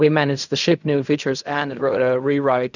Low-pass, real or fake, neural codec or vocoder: 7.2 kHz; fake; codec, 16 kHz in and 24 kHz out, 0.4 kbps, LongCat-Audio-Codec, fine tuned four codebook decoder